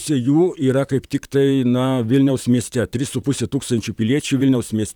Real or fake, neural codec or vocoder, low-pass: fake; vocoder, 44.1 kHz, 128 mel bands, Pupu-Vocoder; 19.8 kHz